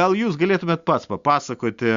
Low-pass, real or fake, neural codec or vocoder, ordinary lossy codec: 7.2 kHz; real; none; Opus, 64 kbps